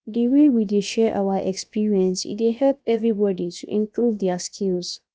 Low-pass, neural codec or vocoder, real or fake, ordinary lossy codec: none; codec, 16 kHz, 0.7 kbps, FocalCodec; fake; none